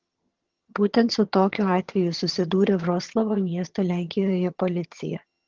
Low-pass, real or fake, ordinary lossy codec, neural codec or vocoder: 7.2 kHz; fake; Opus, 16 kbps; vocoder, 22.05 kHz, 80 mel bands, HiFi-GAN